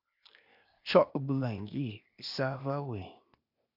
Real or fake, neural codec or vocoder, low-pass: fake; codec, 16 kHz, 0.8 kbps, ZipCodec; 5.4 kHz